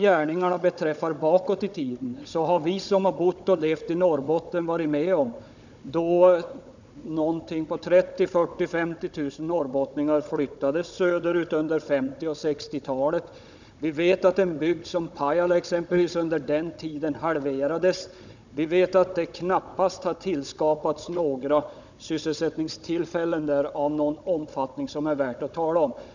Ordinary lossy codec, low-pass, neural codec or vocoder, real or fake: none; 7.2 kHz; codec, 16 kHz, 16 kbps, FunCodec, trained on Chinese and English, 50 frames a second; fake